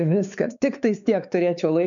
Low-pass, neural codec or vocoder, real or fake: 7.2 kHz; codec, 16 kHz, 4 kbps, X-Codec, WavLM features, trained on Multilingual LibriSpeech; fake